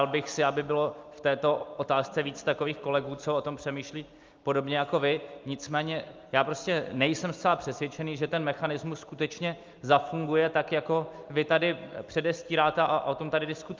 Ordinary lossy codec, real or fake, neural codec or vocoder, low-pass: Opus, 24 kbps; real; none; 7.2 kHz